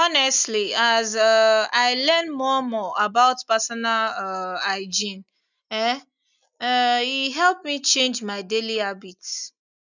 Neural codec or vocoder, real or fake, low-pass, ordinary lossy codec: none; real; 7.2 kHz; none